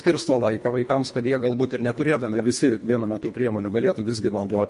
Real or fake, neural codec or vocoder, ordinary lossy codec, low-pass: fake; codec, 24 kHz, 1.5 kbps, HILCodec; MP3, 48 kbps; 10.8 kHz